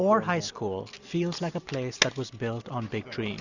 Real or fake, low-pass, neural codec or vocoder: real; 7.2 kHz; none